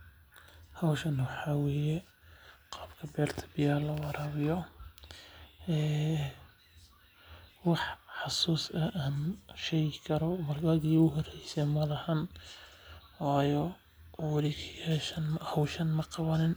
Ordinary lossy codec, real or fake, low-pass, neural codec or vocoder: none; real; none; none